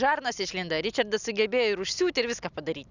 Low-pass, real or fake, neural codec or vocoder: 7.2 kHz; real; none